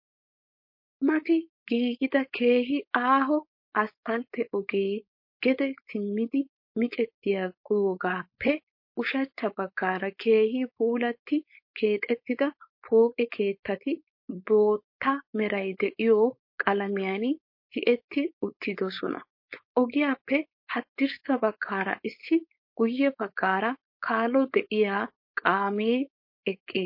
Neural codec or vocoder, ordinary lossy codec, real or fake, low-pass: codec, 16 kHz, 4.8 kbps, FACodec; MP3, 32 kbps; fake; 5.4 kHz